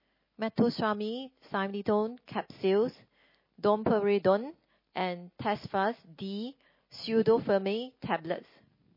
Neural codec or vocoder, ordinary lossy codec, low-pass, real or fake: none; MP3, 24 kbps; 5.4 kHz; real